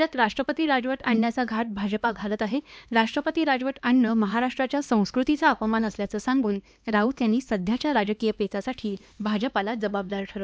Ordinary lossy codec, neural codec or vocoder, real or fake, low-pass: none; codec, 16 kHz, 2 kbps, X-Codec, HuBERT features, trained on LibriSpeech; fake; none